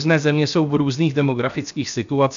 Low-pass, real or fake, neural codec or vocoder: 7.2 kHz; fake; codec, 16 kHz, 0.7 kbps, FocalCodec